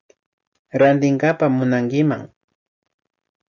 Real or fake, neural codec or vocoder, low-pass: real; none; 7.2 kHz